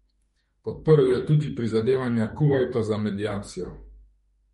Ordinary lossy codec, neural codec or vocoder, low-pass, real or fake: MP3, 48 kbps; autoencoder, 48 kHz, 32 numbers a frame, DAC-VAE, trained on Japanese speech; 19.8 kHz; fake